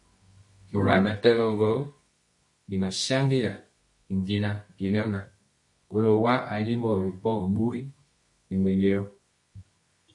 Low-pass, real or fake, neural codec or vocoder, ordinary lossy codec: 10.8 kHz; fake; codec, 24 kHz, 0.9 kbps, WavTokenizer, medium music audio release; MP3, 48 kbps